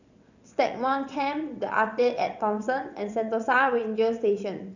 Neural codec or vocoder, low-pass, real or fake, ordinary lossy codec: vocoder, 22.05 kHz, 80 mel bands, WaveNeXt; 7.2 kHz; fake; none